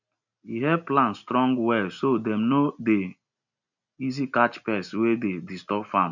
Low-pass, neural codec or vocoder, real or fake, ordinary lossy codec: 7.2 kHz; none; real; none